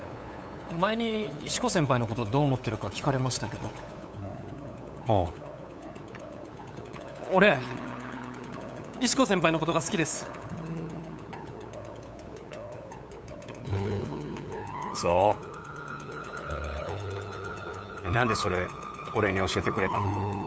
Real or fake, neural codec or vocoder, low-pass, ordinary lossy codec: fake; codec, 16 kHz, 8 kbps, FunCodec, trained on LibriTTS, 25 frames a second; none; none